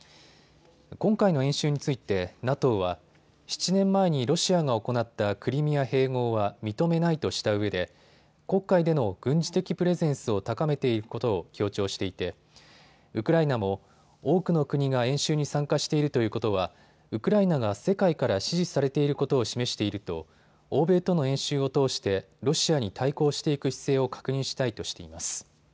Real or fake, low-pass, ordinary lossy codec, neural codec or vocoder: real; none; none; none